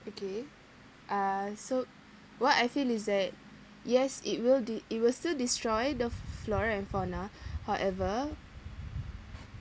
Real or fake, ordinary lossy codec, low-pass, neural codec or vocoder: real; none; none; none